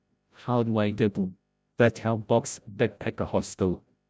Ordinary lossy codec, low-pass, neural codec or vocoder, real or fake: none; none; codec, 16 kHz, 0.5 kbps, FreqCodec, larger model; fake